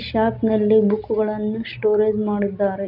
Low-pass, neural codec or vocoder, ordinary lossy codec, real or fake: 5.4 kHz; none; none; real